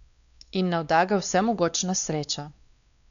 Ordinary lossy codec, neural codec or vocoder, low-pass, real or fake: MP3, 96 kbps; codec, 16 kHz, 2 kbps, X-Codec, WavLM features, trained on Multilingual LibriSpeech; 7.2 kHz; fake